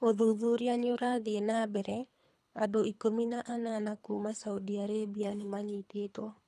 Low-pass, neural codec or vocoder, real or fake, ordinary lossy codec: none; codec, 24 kHz, 3 kbps, HILCodec; fake; none